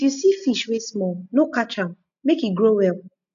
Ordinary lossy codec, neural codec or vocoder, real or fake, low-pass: none; none; real; 7.2 kHz